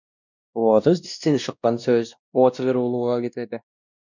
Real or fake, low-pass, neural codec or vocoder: fake; 7.2 kHz; codec, 16 kHz, 1 kbps, X-Codec, WavLM features, trained on Multilingual LibriSpeech